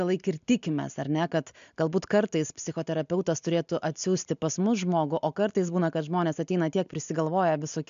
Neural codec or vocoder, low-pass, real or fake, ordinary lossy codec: none; 7.2 kHz; real; MP3, 64 kbps